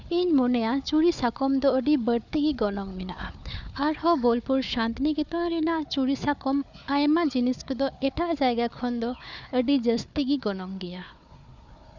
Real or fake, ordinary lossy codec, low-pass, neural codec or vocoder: fake; none; 7.2 kHz; codec, 16 kHz, 4 kbps, FunCodec, trained on Chinese and English, 50 frames a second